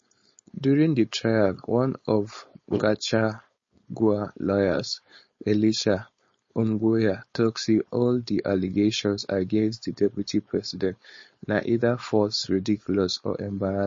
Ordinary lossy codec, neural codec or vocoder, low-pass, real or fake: MP3, 32 kbps; codec, 16 kHz, 4.8 kbps, FACodec; 7.2 kHz; fake